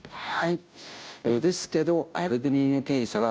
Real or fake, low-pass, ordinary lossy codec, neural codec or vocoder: fake; none; none; codec, 16 kHz, 0.5 kbps, FunCodec, trained on Chinese and English, 25 frames a second